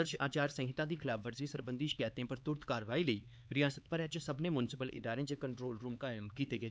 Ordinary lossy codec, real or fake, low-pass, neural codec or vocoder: none; fake; none; codec, 16 kHz, 4 kbps, X-Codec, HuBERT features, trained on LibriSpeech